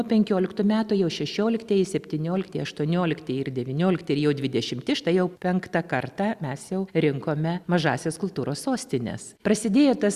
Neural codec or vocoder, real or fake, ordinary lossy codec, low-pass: none; real; Opus, 64 kbps; 14.4 kHz